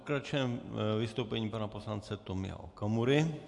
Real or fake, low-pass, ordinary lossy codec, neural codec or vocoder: real; 10.8 kHz; AAC, 64 kbps; none